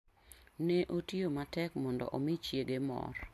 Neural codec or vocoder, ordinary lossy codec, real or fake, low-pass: none; MP3, 64 kbps; real; 14.4 kHz